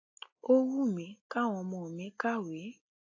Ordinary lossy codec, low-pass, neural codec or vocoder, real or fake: AAC, 48 kbps; 7.2 kHz; none; real